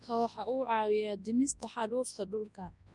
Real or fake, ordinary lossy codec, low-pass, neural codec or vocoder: fake; none; 10.8 kHz; codec, 24 kHz, 0.9 kbps, WavTokenizer, large speech release